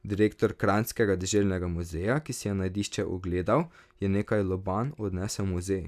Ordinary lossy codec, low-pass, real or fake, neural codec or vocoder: none; 14.4 kHz; real; none